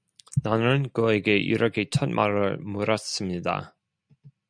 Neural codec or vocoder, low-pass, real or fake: none; 9.9 kHz; real